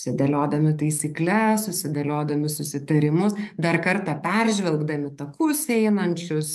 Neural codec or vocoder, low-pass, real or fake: codec, 44.1 kHz, 7.8 kbps, DAC; 14.4 kHz; fake